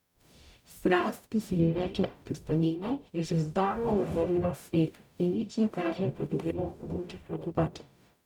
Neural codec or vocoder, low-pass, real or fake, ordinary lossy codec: codec, 44.1 kHz, 0.9 kbps, DAC; 19.8 kHz; fake; none